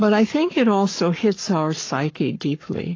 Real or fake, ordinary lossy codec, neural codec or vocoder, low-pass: fake; AAC, 32 kbps; codec, 44.1 kHz, 7.8 kbps, Pupu-Codec; 7.2 kHz